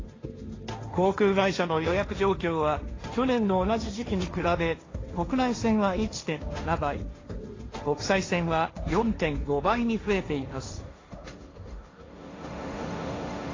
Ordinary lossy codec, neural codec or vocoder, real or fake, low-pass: AAC, 32 kbps; codec, 16 kHz, 1.1 kbps, Voila-Tokenizer; fake; 7.2 kHz